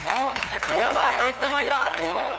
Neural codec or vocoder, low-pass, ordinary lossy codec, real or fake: codec, 16 kHz, 2 kbps, FunCodec, trained on LibriTTS, 25 frames a second; none; none; fake